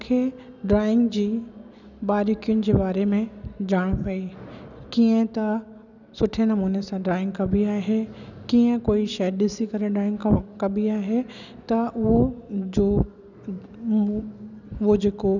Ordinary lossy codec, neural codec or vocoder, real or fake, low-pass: none; none; real; 7.2 kHz